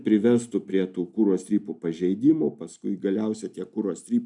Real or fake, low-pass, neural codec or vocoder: real; 10.8 kHz; none